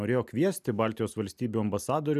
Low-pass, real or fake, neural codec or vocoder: 14.4 kHz; real; none